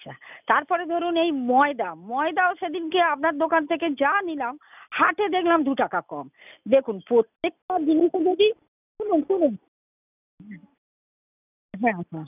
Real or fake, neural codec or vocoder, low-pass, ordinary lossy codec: real; none; 3.6 kHz; none